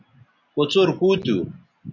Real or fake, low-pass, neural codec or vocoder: real; 7.2 kHz; none